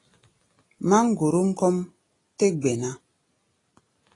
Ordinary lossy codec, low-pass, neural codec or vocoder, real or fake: AAC, 32 kbps; 10.8 kHz; none; real